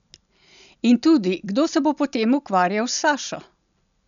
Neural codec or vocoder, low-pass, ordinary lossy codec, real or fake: none; 7.2 kHz; none; real